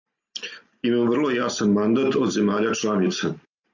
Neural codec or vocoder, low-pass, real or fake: none; 7.2 kHz; real